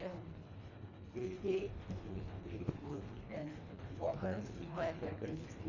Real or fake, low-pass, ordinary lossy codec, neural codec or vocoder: fake; 7.2 kHz; none; codec, 24 kHz, 1.5 kbps, HILCodec